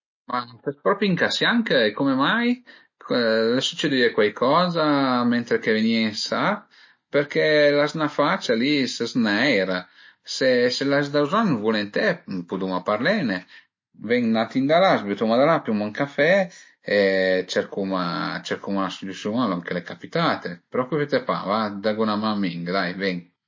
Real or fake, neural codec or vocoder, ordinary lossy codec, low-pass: real; none; MP3, 32 kbps; 7.2 kHz